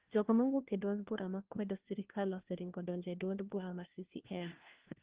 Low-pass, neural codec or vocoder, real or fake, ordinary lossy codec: 3.6 kHz; codec, 16 kHz, 1 kbps, FunCodec, trained on LibriTTS, 50 frames a second; fake; Opus, 16 kbps